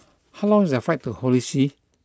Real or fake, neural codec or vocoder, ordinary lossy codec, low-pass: real; none; none; none